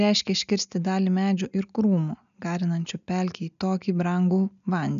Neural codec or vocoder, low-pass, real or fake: none; 7.2 kHz; real